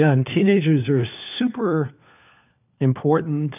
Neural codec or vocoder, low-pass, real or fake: codec, 16 kHz, 4 kbps, FunCodec, trained on LibriTTS, 50 frames a second; 3.6 kHz; fake